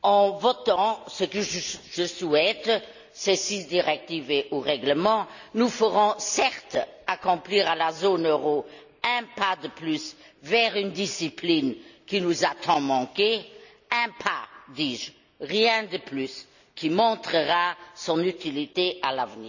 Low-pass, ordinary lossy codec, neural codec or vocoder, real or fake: 7.2 kHz; none; none; real